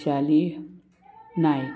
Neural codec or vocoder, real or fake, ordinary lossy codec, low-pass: none; real; none; none